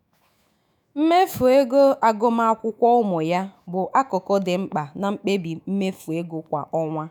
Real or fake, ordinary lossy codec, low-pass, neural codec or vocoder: fake; none; none; autoencoder, 48 kHz, 128 numbers a frame, DAC-VAE, trained on Japanese speech